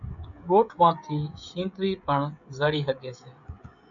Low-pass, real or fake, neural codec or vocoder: 7.2 kHz; fake; codec, 16 kHz, 16 kbps, FreqCodec, smaller model